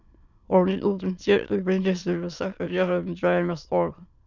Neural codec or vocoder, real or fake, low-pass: autoencoder, 22.05 kHz, a latent of 192 numbers a frame, VITS, trained on many speakers; fake; 7.2 kHz